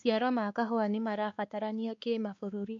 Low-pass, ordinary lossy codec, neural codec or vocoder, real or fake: 7.2 kHz; MP3, 64 kbps; codec, 16 kHz, 2 kbps, X-Codec, WavLM features, trained on Multilingual LibriSpeech; fake